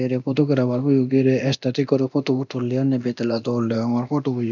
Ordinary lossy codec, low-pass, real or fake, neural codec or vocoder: none; 7.2 kHz; fake; codec, 24 kHz, 0.9 kbps, DualCodec